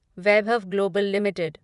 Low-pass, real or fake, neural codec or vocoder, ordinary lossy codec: 10.8 kHz; fake; vocoder, 24 kHz, 100 mel bands, Vocos; none